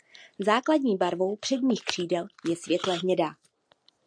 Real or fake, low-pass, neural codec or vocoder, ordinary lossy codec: real; 9.9 kHz; none; MP3, 96 kbps